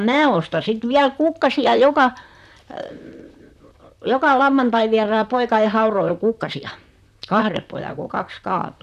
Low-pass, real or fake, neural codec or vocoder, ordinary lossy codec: 14.4 kHz; fake; vocoder, 44.1 kHz, 128 mel bands, Pupu-Vocoder; none